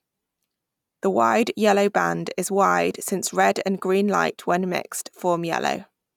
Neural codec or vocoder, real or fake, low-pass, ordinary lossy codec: none; real; 19.8 kHz; none